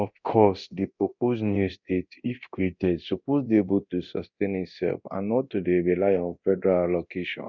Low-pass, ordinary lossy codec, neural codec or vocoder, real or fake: 7.2 kHz; none; codec, 24 kHz, 0.9 kbps, DualCodec; fake